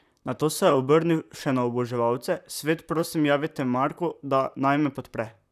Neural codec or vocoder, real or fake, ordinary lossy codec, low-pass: vocoder, 44.1 kHz, 128 mel bands, Pupu-Vocoder; fake; none; 14.4 kHz